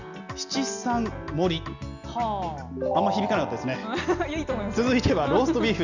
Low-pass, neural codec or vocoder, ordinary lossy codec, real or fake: 7.2 kHz; none; none; real